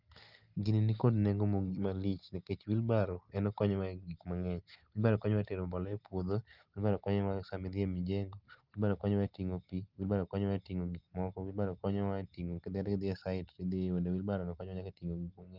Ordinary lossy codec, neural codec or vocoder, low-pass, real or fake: Opus, 32 kbps; none; 5.4 kHz; real